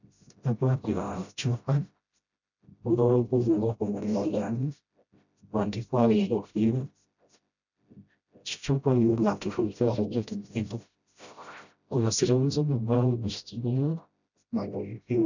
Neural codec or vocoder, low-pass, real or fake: codec, 16 kHz, 0.5 kbps, FreqCodec, smaller model; 7.2 kHz; fake